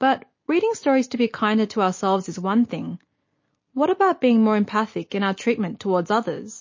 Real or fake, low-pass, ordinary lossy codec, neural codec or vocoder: real; 7.2 kHz; MP3, 32 kbps; none